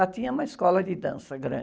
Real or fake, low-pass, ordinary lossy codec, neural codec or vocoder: real; none; none; none